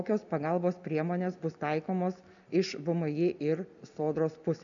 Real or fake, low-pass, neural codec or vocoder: real; 7.2 kHz; none